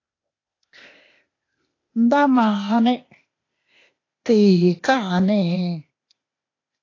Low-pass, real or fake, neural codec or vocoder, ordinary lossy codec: 7.2 kHz; fake; codec, 16 kHz, 0.8 kbps, ZipCodec; AAC, 32 kbps